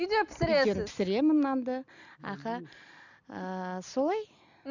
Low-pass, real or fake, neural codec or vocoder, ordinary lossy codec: 7.2 kHz; real; none; none